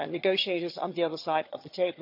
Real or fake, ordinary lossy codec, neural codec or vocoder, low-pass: fake; none; vocoder, 22.05 kHz, 80 mel bands, HiFi-GAN; 5.4 kHz